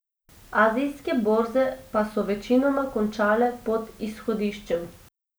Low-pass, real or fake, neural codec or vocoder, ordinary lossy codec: none; real; none; none